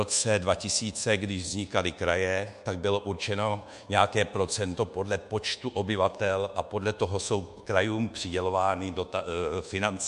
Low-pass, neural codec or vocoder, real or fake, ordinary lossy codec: 10.8 kHz; codec, 24 kHz, 1.2 kbps, DualCodec; fake; MP3, 64 kbps